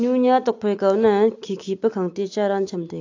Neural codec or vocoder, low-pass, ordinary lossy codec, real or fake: none; 7.2 kHz; AAC, 48 kbps; real